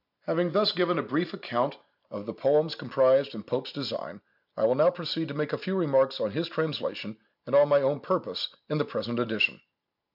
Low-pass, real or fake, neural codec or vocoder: 5.4 kHz; real; none